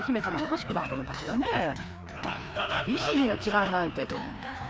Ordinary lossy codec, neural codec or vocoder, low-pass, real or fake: none; codec, 16 kHz, 2 kbps, FreqCodec, larger model; none; fake